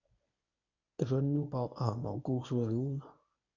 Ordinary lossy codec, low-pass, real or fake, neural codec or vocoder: none; 7.2 kHz; fake; codec, 24 kHz, 0.9 kbps, WavTokenizer, medium speech release version 1